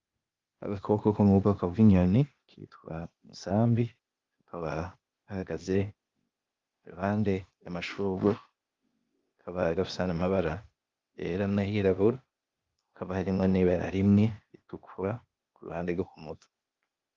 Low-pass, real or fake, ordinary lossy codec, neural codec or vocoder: 7.2 kHz; fake; Opus, 32 kbps; codec, 16 kHz, 0.8 kbps, ZipCodec